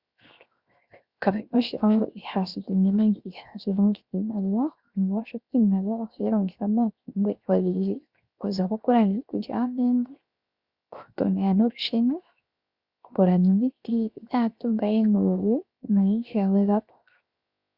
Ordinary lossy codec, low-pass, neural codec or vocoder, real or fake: Opus, 64 kbps; 5.4 kHz; codec, 16 kHz, 0.7 kbps, FocalCodec; fake